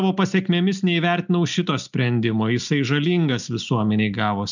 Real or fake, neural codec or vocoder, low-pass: real; none; 7.2 kHz